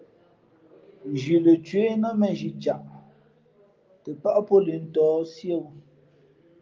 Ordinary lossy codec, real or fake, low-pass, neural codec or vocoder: Opus, 32 kbps; real; 7.2 kHz; none